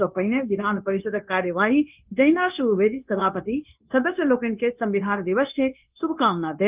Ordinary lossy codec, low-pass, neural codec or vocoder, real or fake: Opus, 24 kbps; 3.6 kHz; codec, 16 kHz, 0.9 kbps, LongCat-Audio-Codec; fake